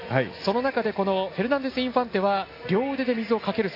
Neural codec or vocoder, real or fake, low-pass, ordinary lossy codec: none; real; 5.4 kHz; AAC, 32 kbps